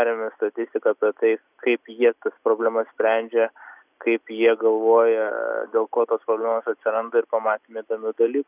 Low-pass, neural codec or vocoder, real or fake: 3.6 kHz; none; real